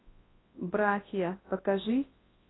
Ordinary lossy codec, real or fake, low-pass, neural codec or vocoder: AAC, 16 kbps; fake; 7.2 kHz; codec, 24 kHz, 0.9 kbps, WavTokenizer, large speech release